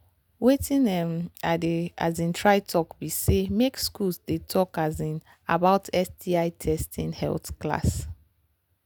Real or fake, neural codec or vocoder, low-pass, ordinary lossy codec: real; none; none; none